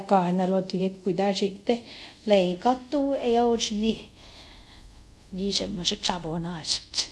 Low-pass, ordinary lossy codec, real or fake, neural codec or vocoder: none; none; fake; codec, 24 kHz, 0.5 kbps, DualCodec